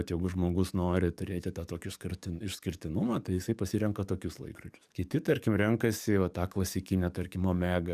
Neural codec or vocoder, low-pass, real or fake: codec, 44.1 kHz, 7.8 kbps, DAC; 14.4 kHz; fake